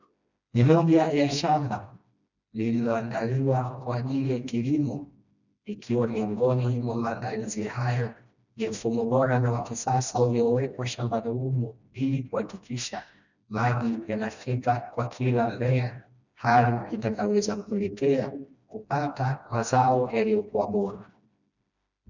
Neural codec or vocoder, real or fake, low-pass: codec, 16 kHz, 1 kbps, FreqCodec, smaller model; fake; 7.2 kHz